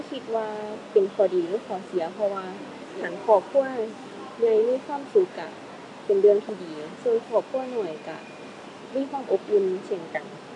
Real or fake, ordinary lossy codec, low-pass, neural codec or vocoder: real; AAC, 48 kbps; 10.8 kHz; none